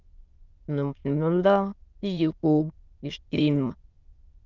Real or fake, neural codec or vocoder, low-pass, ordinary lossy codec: fake; autoencoder, 22.05 kHz, a latent of 192 numbers a frame, VITS, trained on many speakers; 7.2 kHz; Opus, 24 kbps